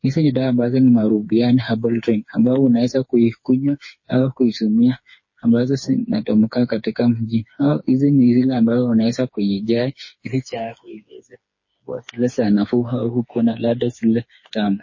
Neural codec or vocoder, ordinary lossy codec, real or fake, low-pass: codec, 16 kHz, 4 kbps, FreqCodec, smaller model; MP3, 32 kbps; fake; 7.2 kHz